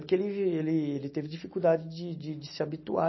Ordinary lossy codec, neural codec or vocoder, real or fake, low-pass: MP3, 24 kbps; none; real; 7.2 kHz